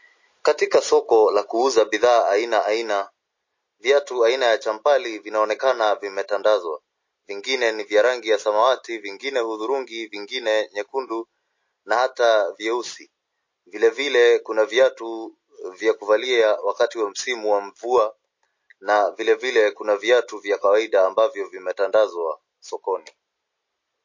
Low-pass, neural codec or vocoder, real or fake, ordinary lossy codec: 7.2 kHz; none; real; MP3, 32 kbps